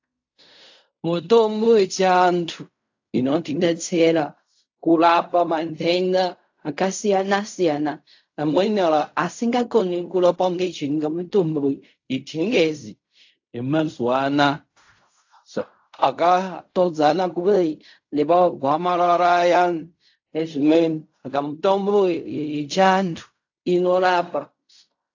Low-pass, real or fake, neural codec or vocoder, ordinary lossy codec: 7.2 kHz; fake; codec, 16 kHz in and 24 kHz out, 0.4 kbps, LongCat-Audio-Codec, fine tuned four codebook decoder; AAC, 48 kbps